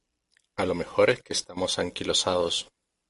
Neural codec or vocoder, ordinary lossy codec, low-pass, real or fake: none; MP3, 48 kbps; 14.4 kHz; real